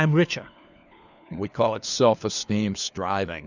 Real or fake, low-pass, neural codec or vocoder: fake; 7.2 kHz; codec, 16 kHz, 4 kbps, FunCodec, trained on LibriTTS, 50 frames a second